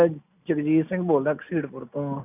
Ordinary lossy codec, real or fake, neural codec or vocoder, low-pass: none; real; none; 3.6 kHz